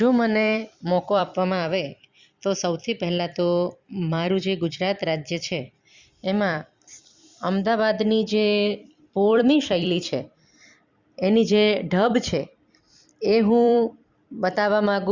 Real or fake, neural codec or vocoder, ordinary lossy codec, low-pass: fake; vocoder, 44.1 kHz, 128 mel bands every 256 samples, BigVGAN v2; Opus, 64 kbps; 7.2 kHz